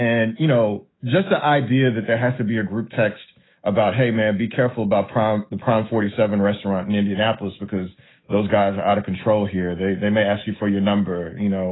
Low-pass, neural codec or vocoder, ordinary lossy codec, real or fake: 7.2 kHz; autoencoder, 48 kHz, 128 numbers a frame, DAC-VAE, trained on Japanese speech; AAC, 16 kbps; fake